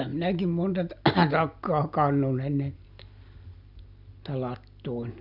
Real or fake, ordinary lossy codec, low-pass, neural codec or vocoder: real; none; 5.4 kHz; none